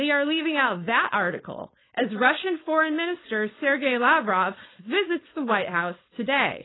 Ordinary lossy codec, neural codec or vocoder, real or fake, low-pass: AAC, 16 kbps; codec, 16 kHz, 4.8 kbps, FACodec; fake; 7.2 kHz